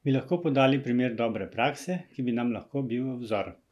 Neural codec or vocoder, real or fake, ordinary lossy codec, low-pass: none; real; none; 14.4 kHz